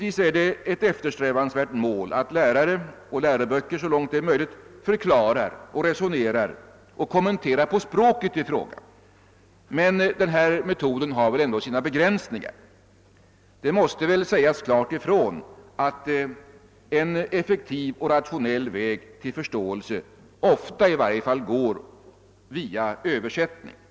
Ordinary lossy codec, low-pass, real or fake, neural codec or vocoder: none; none; real; none